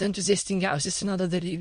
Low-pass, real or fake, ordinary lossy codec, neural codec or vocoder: 9.9 kHz; fake; MP3, 48 kbps; autoencoder, 22.05 kHz, a latent of 192 numbers a frame, VITS, trained on many speakers